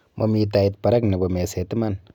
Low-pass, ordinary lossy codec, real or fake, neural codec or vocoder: 19.8 kHz; none; real; none